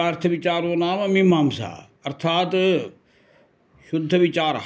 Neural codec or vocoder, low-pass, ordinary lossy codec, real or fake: none; none; none; real